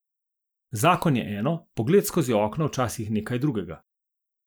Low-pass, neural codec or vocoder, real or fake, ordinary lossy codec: none; none; real; none